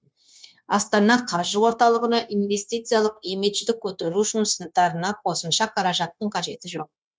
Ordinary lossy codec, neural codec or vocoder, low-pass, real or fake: none; codec, 16 kHz, 0.9 kbps, LongCat-Audio-Codec; none; fake